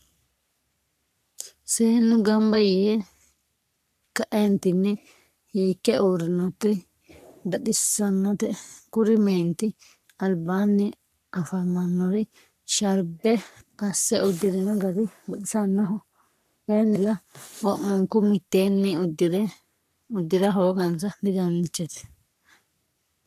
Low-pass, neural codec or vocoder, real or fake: 14.4 kHz; codec, 44.1 kHz, 3.4 kbps, Pupu-Codec; fake